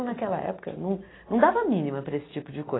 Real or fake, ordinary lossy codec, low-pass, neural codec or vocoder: real; AAC, 16 kbps; 7.2 kHz; none